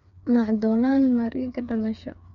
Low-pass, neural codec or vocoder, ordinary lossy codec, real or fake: 7.2 kHz; codec, 16 kHz, 4 kbps, FreqCodec, smaller model; none; fake